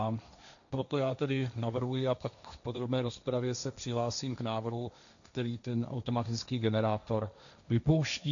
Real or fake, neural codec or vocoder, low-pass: fake; codec, 16 kHz, 1.1 kbps, Voila-Tokenizer; 7.2 kHz